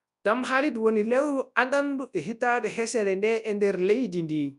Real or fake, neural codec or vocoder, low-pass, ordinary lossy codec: fake; codec, 24 kHz, 0.9 kbps, WavTokenizer, large speech release; 10.8 kHz; none